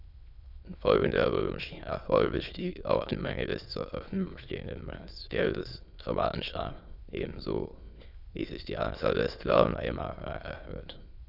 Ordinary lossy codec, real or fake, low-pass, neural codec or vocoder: none; fake; 5.4 kHz; autoencoder, 22.05 kHz, a latent of 192 numbers a frame, VITS, trained on many speakers